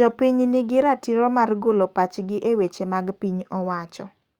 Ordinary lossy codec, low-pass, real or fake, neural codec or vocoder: Opus, 64 kbps; 19.8 kHz; fake; codec, 44.1 kHz, 7.8 kbps, DAC